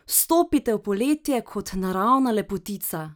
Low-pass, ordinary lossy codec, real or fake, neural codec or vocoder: none; none; real; none